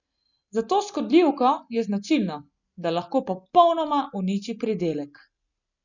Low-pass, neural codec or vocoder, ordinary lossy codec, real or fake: 7.2 kHz; none; none; real